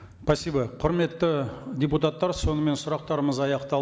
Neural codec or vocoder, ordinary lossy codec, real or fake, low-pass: none; none; real; none